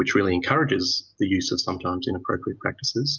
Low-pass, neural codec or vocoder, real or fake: 7.2 kHz; none; real